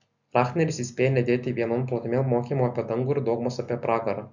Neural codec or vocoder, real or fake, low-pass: none; real; 7.2 kHz